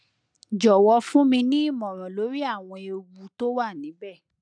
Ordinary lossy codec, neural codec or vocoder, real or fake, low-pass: none; none; real; 9.9 kHz